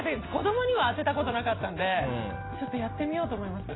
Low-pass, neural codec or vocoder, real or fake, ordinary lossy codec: 7.2 kHz; none; real; AAC, 16 kbps